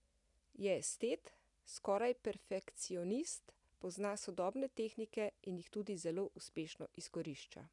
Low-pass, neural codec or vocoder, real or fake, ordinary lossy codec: 10.8 kHz; none; real; none